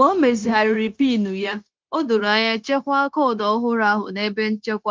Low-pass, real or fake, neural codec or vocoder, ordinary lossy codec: 7.2 kHz; fake; codec, 16 kHz, 0.9 kbps, LongCat-Audio-Codec; Opus, 24 kbps